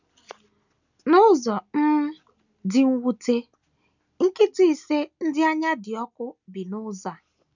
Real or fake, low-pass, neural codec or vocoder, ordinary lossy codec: real; 7.2 kHz; none; none